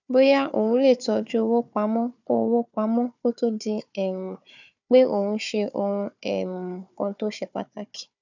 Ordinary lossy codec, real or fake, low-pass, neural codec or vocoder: none; fake; 7.2 kHz; codec, 16 kHz, 4 kbps, FunCodec, trained on Chinese and English, 50 frames a second